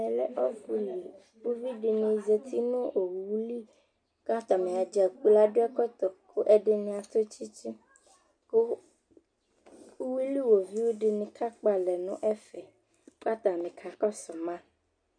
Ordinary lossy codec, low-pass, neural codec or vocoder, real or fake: MP3, 64 kbps; 9.9 kHz; none; real